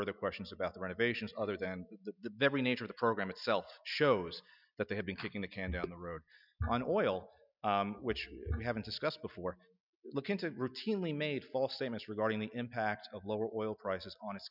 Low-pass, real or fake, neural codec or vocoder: 5.4 kHz; real; none